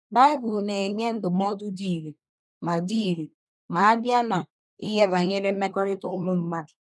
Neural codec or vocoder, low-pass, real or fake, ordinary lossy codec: codec, 24 kHz, 1 kbps, SNAC; none; fake; none